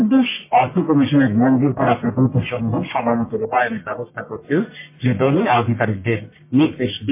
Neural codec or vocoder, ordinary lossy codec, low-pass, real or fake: codec, 44.1 kHz, 1.7 kbps, Pupu-Codec; MP3, 24 kbps; 3.6 kHz; fake